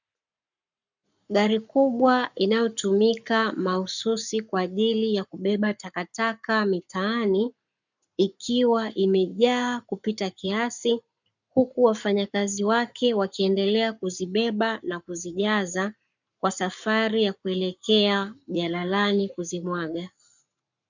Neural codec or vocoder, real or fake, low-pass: codec, 44.1 kHz, 7.8 kbps, Pupu-Codec; fake; 7.2 kHz